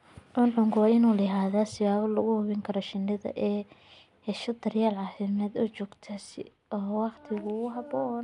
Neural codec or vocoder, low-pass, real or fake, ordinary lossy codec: none; 10.8 kHz; real; none